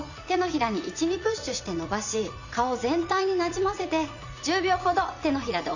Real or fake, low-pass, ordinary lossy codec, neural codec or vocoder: fake; 7.2 kHz; none; vocoder, 44.1 kHz, 80 mel bands, Vocos